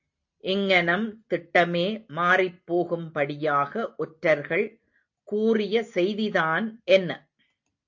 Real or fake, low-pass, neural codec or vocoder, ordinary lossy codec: real; 7.2 kHz; none; AAC, 48 kbps